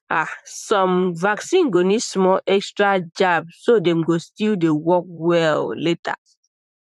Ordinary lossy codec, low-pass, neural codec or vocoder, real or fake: none; 14.4 kHz; vocoder, 44.1 kHz, 128 mel bands, Pupu-Vocoder; fake